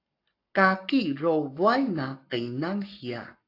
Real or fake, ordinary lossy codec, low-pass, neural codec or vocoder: fake; AAC, 32 kbps; 5.4 kHz; codec, 44.1 kHz, 7.8 kbps, Pupu-Codec